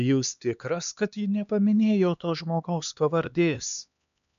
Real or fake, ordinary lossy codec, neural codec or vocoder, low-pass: fake; MP3, 96 kbps; codec, 16 kHz, 2 kbps, X-Codec, HuBERT features, trained on LibriSpeech; 7.2 kHz